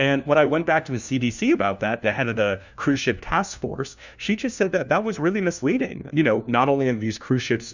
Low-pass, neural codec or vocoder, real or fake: 7.2 kHz; codec, 16 kHz, 1 kbps, FunCodec, trained on LibriTTS, 50 frames a second; fake